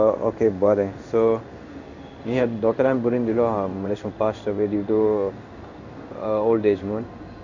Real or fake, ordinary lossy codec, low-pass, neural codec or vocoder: fake; none; 7.2 kHz; codec, 16 kHz in and 24 kHz out, 1 kbps, XY-Tokenizer